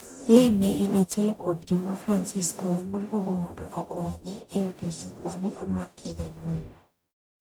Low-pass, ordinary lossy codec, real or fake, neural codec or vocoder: none; none; fake; codec, 44.1 kHz, 0.9 kbps, DAC